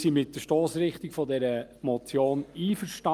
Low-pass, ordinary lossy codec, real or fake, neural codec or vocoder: 14.4 kHz; Opus, 32 kbps; real; none